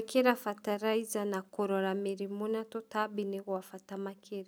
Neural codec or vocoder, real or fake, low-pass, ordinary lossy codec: none; real; none; none